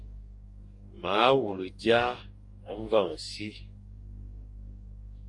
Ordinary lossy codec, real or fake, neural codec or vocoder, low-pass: MP3, 48 kbps; fake; codec, 44.1 kHz, 2.6 kbps, DAC; 10.8 kHz